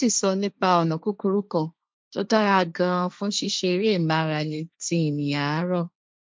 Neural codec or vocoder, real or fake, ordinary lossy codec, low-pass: codec, 16 kHz, 1.1 kbps, Voila-Tokenizer; fake; none; none